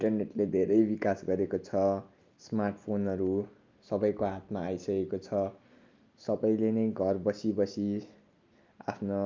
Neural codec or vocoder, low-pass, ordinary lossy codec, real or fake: none; 7.2 kHz; Opus, 24 kbps; real